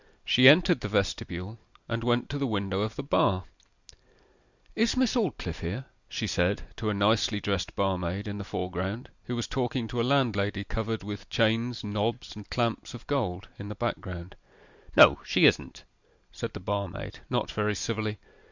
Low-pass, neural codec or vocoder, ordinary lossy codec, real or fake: 7.2 kHz; none; Opus, 64 kbps; real